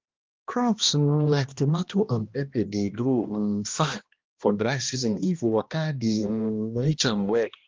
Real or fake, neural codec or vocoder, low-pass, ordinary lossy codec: fake; codec, 16 kHz, 1 kbps, X-Codec, HuBERT features, trained on balanced general audio; 7.2 kHz; Opus, 24 kbps